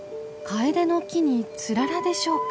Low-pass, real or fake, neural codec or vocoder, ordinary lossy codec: none; real; none; none